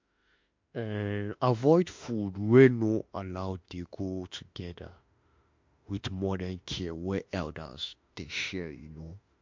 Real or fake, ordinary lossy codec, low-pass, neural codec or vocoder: fake; MP3, 48 kbps; 7.2 kHz; autoencoder, 48 kHz, 32 numbers a frame, DAC-VAE, trained on Japanese speech